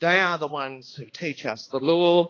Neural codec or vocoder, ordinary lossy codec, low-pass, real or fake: codec, 16 kHz, 2 kbps, X-Codec, HuBERT features, trained on balanced general audio; AAC, 32 kbps; 7.2 kHz; fake